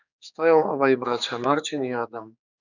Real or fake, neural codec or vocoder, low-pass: fake; codec, 16 kHz, 4 kbps, X-Codec, HuBERT features, trained on general audio; 7.2 kHz